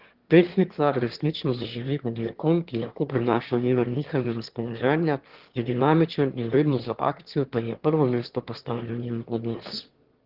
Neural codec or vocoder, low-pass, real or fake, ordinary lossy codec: autoencoder, 22.05 kHz, a latent of 192 numbers a frame, VITS, trained on one speaker; 5.4 kHz; fake; Opus, 16 kbps